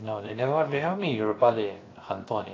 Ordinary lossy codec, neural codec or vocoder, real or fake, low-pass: AAC, 32 kbps; codec, 16 kHz, 0.7 kbps, FocalCodec; fake; 7.2 kHz